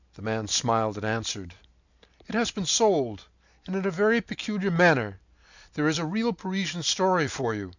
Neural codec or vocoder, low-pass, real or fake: none; 7.2 kHz; real